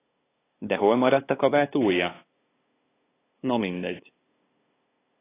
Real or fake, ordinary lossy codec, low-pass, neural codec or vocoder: fake; AAC, 16 kbps; 3.6 kHz; codec, 16 kHz, 2 kbps, FunCodec, trained on LibriTTS, 25 frames a second